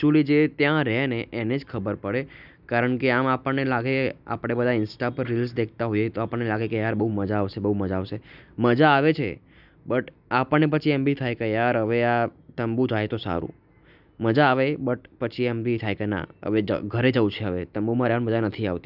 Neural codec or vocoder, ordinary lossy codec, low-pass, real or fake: none; none; 5.4 kHz; real